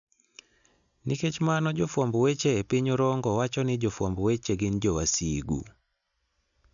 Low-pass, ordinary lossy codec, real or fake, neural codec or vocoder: 7.2 kHz; none; real; none